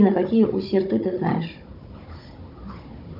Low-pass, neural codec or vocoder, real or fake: 5.4 kHz; codec, 16 kHz, 16 kbps, FunCodec, trained on Chinese and English, 50 frames a second; fake